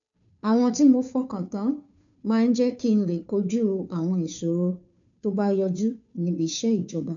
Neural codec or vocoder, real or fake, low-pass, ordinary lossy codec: codec, 16 kHz, 2 kbps, FunCodec, trained on Chinese and English, 25 frames a second; fake; 7.2 kHz; none